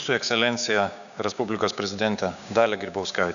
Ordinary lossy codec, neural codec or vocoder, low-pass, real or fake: MP3, 96 kbps; codec, 16 kHz, 6 kbps, DAC; 7.2 kHz; fake